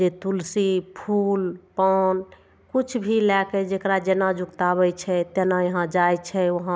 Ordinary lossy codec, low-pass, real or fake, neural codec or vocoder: none; none; real; none